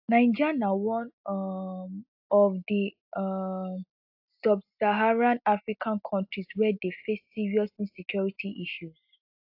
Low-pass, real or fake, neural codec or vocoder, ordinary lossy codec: 5.4 kHz; real; none; AAC, 48 kbps